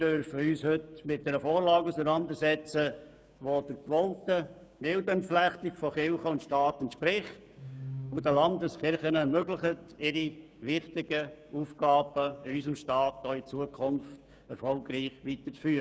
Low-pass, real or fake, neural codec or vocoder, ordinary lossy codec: none; fake; codec, 16 kHz, 6 kbps, DAC; none